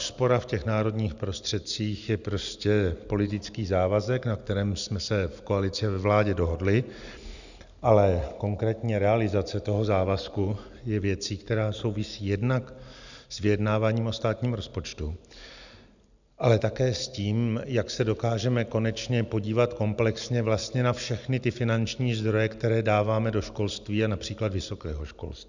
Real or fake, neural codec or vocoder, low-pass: real; none; 7.2 kHz